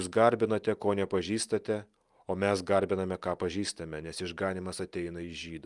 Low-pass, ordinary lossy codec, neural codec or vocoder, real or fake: 10.8 kHz; Opus, 32 kbps; none; real